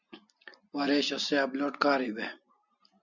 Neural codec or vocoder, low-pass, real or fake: none; 7.2 kHz; real